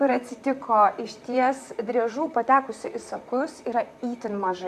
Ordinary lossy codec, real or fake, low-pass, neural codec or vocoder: AAC, 96 kbps; fake; 14.4 kHz; vocoder, 44.1 kHz, 128 mel bands, Pupu-Vocoder